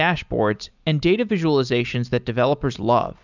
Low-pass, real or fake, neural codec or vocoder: 7.2 kHz; real; none